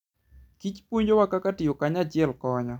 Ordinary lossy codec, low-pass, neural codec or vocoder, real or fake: none; 19.8 kHz; none; real